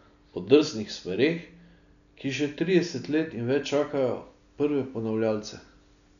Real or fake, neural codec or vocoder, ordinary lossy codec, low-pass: real; none; none; 7.2 kHz